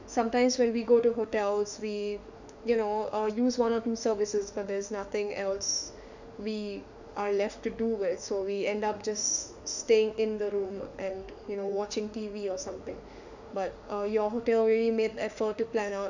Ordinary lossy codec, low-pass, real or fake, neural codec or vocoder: none; 7.2 kHz; fake; autoencoder, 48 kHz, 32 numbers a frame, DAC-VAE, trained on Japanese speech